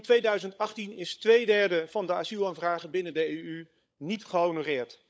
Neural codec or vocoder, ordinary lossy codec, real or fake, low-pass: codec, 16 kHz, 16 kbps, FunCodec, trained on LibriTTS, 50 frames a second; none; fake; none